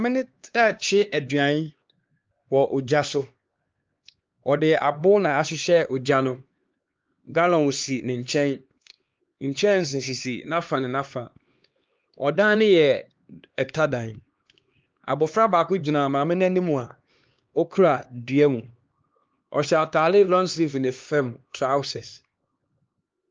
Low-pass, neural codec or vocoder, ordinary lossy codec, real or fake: 7.2 kHz; codec, 16 kHz, 2 kbps, X-Codec, HuBERT features, trained on LibriSpeech; Opus, 24 kbps; fake